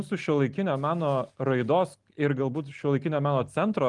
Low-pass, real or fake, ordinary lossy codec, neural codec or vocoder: 10.8 kHz; real; Opus, 32 kbps; none